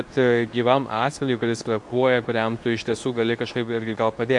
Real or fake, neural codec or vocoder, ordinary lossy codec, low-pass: fake; codec, 24 kHz, 0.9 kbps, WavTokenizer, medium speech release version 2; MP3, 64 kbps; 10.8 kHz